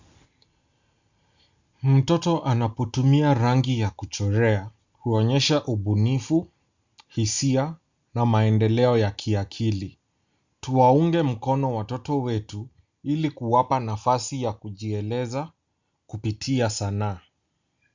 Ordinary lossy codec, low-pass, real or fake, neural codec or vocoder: Opus, 64 kbps; 7.2 kHz; real; none